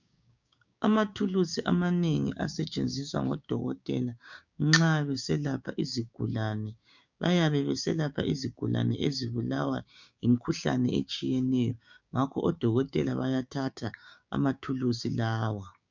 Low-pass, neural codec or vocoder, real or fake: 7.2 kHz; codec, 16 kHz, 6 kbps, DAC; fake